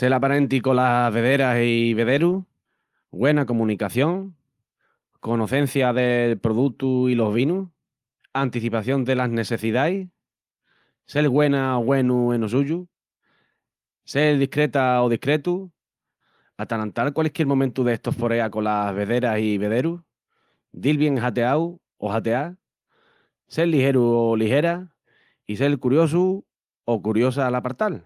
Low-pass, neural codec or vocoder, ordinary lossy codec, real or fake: 14.4 kHz; none; Opus, 32 kbps; real